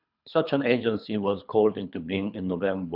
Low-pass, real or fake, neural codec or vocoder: 5.4 kHz; fake; codec, 24 kHz, 3 kbps, HILCodec